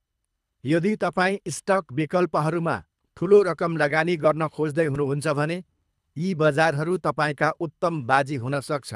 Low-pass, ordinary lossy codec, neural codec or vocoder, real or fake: none; none; codec, 24 kHz, 3 kbps, HILCodec; fake